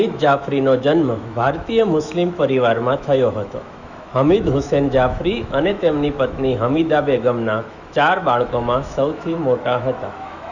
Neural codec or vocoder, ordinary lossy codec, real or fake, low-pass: autoencoder, 48 kHz, 128 numbers a frame, DAC-VAE, trained on Japanese speech; none; fake; 7.2 kHz